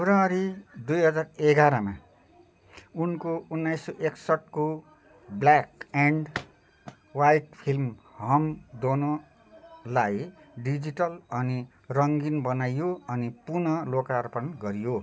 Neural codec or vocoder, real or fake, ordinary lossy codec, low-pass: none; real; none; none